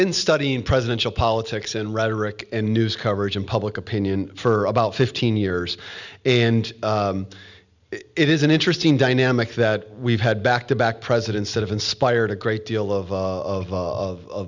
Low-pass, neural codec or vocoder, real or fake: 7.2 kHz; none; real